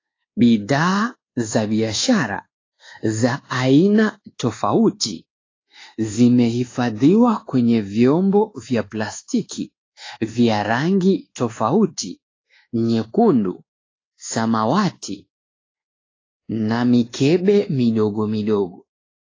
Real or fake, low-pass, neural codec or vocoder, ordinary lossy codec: fake; 7.2 kHz; codec, 24 kHz, 1.2 kbps, DualCodec; AAC, 32 kbps